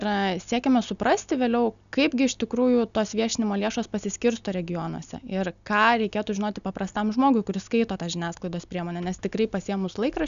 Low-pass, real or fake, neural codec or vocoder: 7.2 kHz; real; none